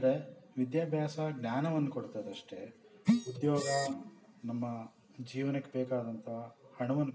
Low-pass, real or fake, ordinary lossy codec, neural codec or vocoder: none; real; none; none